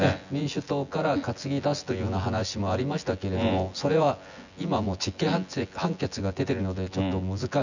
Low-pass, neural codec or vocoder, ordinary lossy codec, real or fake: 7.2 kHz; vocoder, 24 kHz, 100 mel bands, Vocos; none; fake